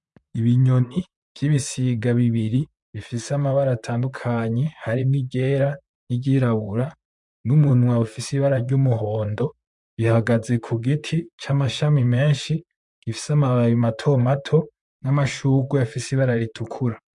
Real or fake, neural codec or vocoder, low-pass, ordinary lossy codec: fake; vocoder, 44.1 kHz, 128 mel bands, Pupu-Vocoder; 10.8 kHz; MP3, 64 kbps